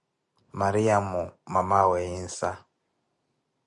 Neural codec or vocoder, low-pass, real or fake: none; 10.8 kHz; real